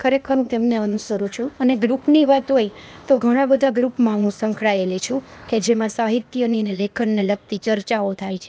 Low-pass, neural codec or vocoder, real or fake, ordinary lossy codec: none; codec, 16 kHz, 0.8 kbps, ZipCodec; fake; none